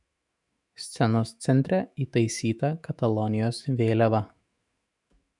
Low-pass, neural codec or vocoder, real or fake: 10.8 kHz; autoencoder, 48 kHz, 128 numbers a frame, DAC-VAE, trained on Japanese speech; fake